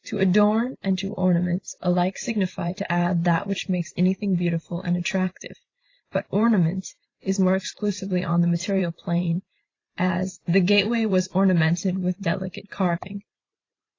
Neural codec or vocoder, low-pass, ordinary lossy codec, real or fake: vocoder, 44.1 kHz, 128 mel bands every 512 samples, BigVGAN v2; 7.2 kHz; AAC, 32 kbps; fake